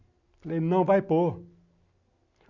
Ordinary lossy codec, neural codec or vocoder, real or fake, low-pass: none; none; real; 7.2 kHz